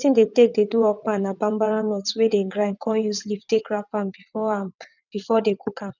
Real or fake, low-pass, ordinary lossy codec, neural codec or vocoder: fake; 7.2 kHz; none; vocoder, 22.05 kHz, 80 mel bands, WaveNeXt